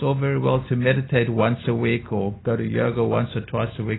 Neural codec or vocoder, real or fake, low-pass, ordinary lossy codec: none; real; 7.2 kHz; AAC, 16 kbps